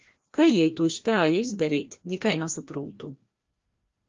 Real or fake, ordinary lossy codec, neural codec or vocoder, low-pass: fake; Opus, 32 kbps; codec, 16 kHz, 1 kbps, FreqCodec, larger model; 7.2 kHz